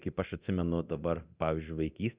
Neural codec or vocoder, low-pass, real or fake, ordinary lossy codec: codec, 24 kHz, 0.9 kbps, DualCodec; 3.6 kHz; fake; Opus, 64 kbps